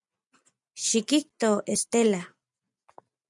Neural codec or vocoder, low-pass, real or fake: none; 10.8 kHz; real